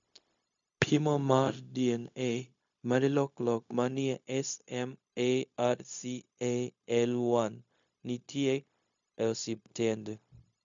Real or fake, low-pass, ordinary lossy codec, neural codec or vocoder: fake; 7.2 kHz; MP3, 64 kbps; codec, 16 kHz, 0.4 kbps, LongCat-Audio-Codec